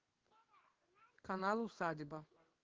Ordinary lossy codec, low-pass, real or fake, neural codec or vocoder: Opus, 16 kbps; 7.2 kHz; fake; codec, 16 kHz in and 24 kHz out, 1 kbps, XY-Tokenizer